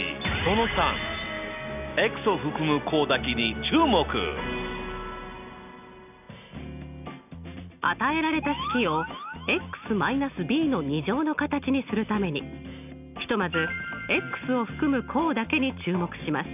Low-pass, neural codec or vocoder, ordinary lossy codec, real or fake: 3.6 kHz; none; none; real